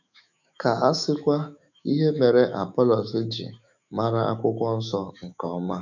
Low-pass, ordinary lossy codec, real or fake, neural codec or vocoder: 7.2 kHz; none; fake; autoencoder, 48 kHz, 128 numbers a frame, DAC-VAE, trained on Japanese speech